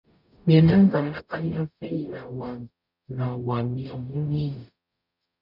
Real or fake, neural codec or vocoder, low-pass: fake; codec, 44.1 kHz, 0.9 kbps, DAC; 5.4 kHz